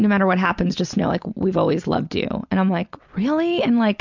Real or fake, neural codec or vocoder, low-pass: real; none; 7.2 kHz